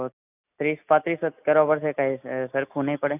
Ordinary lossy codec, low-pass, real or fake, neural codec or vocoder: none; 3.6 kHz; real; none